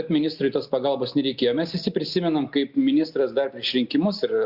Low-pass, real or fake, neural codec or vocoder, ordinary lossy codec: 5.4 kHz; real; none; Opus, 64 kbps